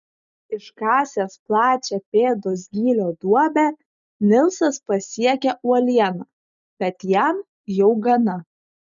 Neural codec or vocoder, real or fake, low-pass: none; real; 7.2 kHz